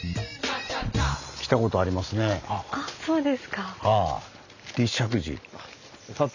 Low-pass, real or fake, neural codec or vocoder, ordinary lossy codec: 7.2 kHz; real; none; none